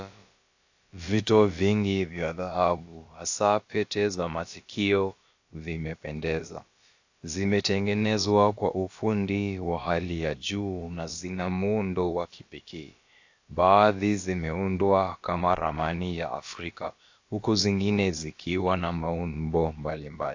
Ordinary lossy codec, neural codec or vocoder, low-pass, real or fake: AAC, 48 kbps; codec, 16 kHz, about 1 kbps, DyCAST, with the encoder's durations; 7.2 kHz; fake